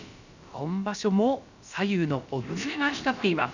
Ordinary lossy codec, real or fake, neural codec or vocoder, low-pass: none; fake; codec, 16 kHz, about 1 kbps, DyCAST, with the encoder's durations; 7.2 kHz